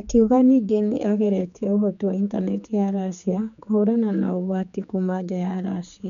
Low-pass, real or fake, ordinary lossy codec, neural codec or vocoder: 7.2 kHz; fake; none; codec, 16 kHz, 4 kbps, X-Codec, HuBERT features, trained on general audio